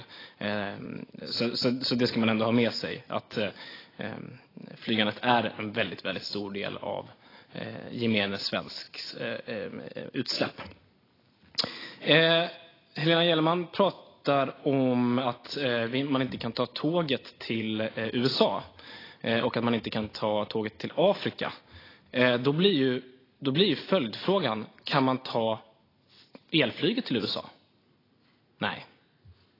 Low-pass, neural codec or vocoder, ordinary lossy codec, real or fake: 5.4 kHz; none; AAC, 24 kbps; real